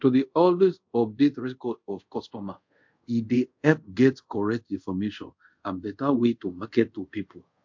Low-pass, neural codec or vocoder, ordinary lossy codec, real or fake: 7.2 kHz; codec, 24 kHz, 0.5 kbps, DualCodec; MP3, 48 kbps; fake